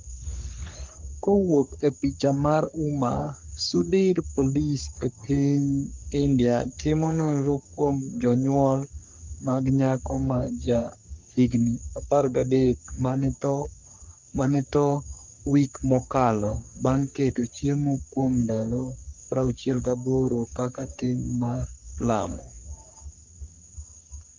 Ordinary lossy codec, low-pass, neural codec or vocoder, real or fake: Opus, 24 kbps; 7.2 kHz; codec, 44.1 kHz, 3.4 kbps, Pupu-Codec; fake